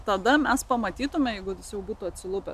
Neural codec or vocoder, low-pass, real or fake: none; 14.4 kHz; real